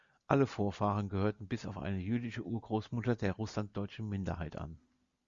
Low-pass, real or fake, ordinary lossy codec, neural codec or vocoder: 7.2 kHz; real; Opus, 64 kbps; none